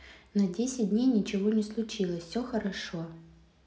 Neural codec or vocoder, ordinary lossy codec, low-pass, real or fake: none; none; none; real